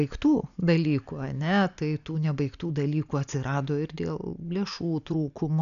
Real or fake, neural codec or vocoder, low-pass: real; none; 7.2 kHz